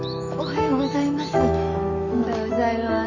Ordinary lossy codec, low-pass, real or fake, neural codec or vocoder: none; 7.2 kHz; fake; autoencoder, 48 kHz, 128 numbers a frame, DAC-VAE, trained on Japanese speech